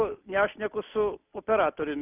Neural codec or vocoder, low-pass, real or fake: none; 3.6 kHz; real